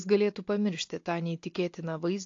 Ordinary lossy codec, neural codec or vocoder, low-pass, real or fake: AAC, 48 kbps; none; 7.2 kHz; real